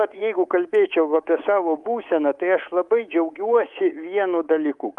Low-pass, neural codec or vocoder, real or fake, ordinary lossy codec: 10.8 kHz; codec, 24 kHz, 3.1 kbps, DualCodec; fake; Opus, 32 kbps